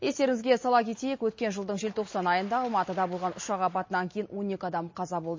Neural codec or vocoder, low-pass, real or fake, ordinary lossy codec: none; 7.2 kHz; real; MP3, 32 kbps